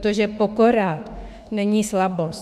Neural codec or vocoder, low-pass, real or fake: autoencoder, 48 kHz, 32 numbers a frame, DAC-VAE, trained on Japanese speech; 14.4 kHz; fake